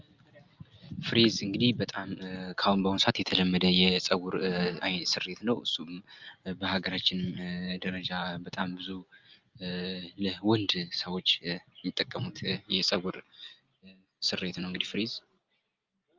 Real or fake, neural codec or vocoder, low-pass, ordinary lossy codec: real; none; 7.2 kHz; Opus, 24 kbps